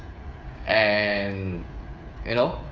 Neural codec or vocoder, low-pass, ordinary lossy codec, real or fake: codec, 16 kHz, 8 kbps, FreqCodec, smaller model; none; none; fake